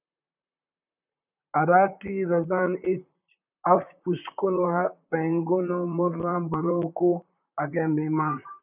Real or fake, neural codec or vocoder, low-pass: fake; vocoder, 44.1 kHz, 128 mel bands, Pupu-Vocoder; 3.6 kHz